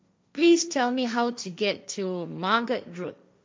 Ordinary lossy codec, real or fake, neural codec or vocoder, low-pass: none; fake; codec, 16 kHz, 1.1 kbps, Voila-Tokenizer; none